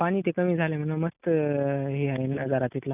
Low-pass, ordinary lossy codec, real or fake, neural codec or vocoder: 3.6 kHz; none; real; none